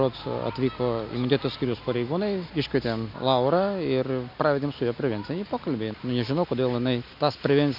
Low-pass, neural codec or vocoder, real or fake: 5.4 kHz; none; real